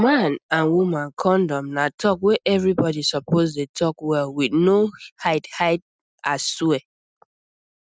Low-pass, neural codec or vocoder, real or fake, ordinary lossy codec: none; none; real; none